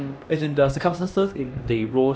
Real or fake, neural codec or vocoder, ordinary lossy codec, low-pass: fake; codec, 16 kHz, 1 kbps, X-Codec, HuBERT features, trained on LibriSpeech; none; none